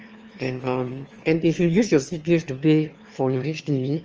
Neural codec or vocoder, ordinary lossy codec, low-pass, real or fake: autoencoder, 22.05 kHz, a latent of 192 numbers a frame, VITS, trained on one speaker; Opus, 24 kbps; 7.2 kHz; fake